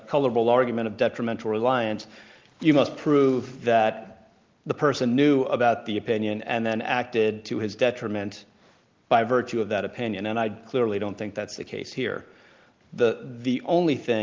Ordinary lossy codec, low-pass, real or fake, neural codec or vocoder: Opus, 32 kbps; 7.2 kHz; real; none